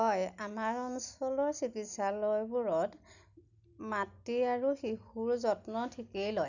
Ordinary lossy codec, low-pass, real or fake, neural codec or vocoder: none; 7.2 kHz; real; none